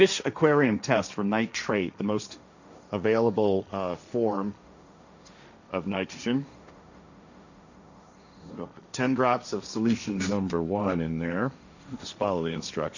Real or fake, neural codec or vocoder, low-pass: fake; codec, 16 kHz, 1.1 kbps, Voila-Tokenizer; 7.2 kHz